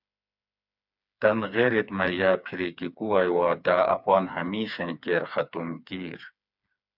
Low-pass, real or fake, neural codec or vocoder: 5.4 kHz; fake; codec, 16 kHz, 4 kbps, FreqCodec, smaller model